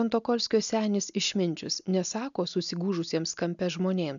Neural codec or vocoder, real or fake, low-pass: none; real; 7.2 kHz